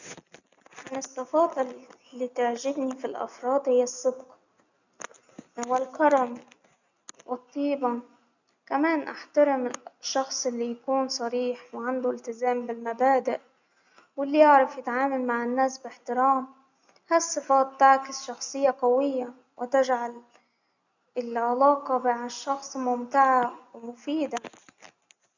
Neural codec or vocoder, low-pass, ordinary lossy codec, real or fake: none; 7.2 kHz; none; real